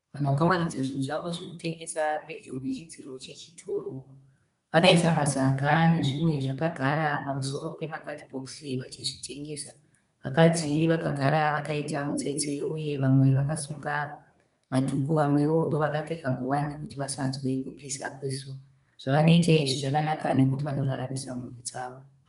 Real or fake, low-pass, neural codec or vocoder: fake; 10.8 kHz; codec, 24 kHz, 1 kbps, SNAC